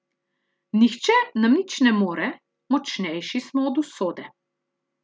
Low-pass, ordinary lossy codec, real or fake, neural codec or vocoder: none; none; real; none